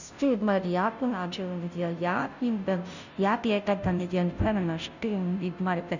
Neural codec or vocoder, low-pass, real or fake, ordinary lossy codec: codec, 16 kHz, 0.5 kbps, FunCodec, trained on Chinese and English, 25 frames a second; 7.2 kHz; fake; none